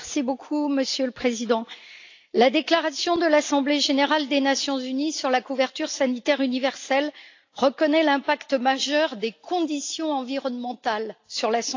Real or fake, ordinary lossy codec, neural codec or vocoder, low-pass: real; AAC, 48 kbps; none; 7.2 kHz